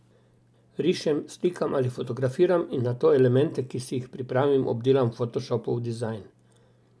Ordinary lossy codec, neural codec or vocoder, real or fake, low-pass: none; none; real; none